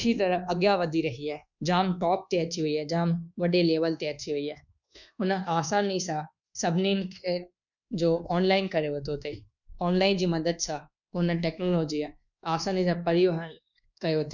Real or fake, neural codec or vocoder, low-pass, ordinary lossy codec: fake; codec, 24 kHz, 1.2 kbps, DualCodec; 7.2 kHz; none